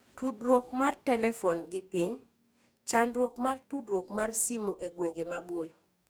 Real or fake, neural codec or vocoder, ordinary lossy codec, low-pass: fake; codec, 44.1 kHz, 2.6 kbps, DAC; none; none